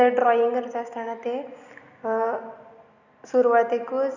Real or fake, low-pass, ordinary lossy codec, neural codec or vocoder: real; 7.2 kHz; none; none